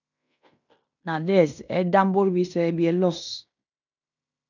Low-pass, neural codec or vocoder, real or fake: 7.2 kHz; codec, 16 kHz in and 24 kHz out, 0.9 kbps, LongCat-Audio-Codec, fine tuned four codebook decoder; fake